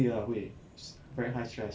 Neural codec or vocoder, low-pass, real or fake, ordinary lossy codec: none; none; real; none